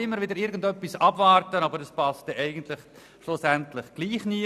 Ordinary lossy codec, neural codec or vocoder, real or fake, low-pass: none; none; real; 14.4 kHz